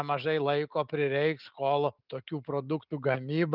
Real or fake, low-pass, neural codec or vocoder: real; 5.4 kHz; none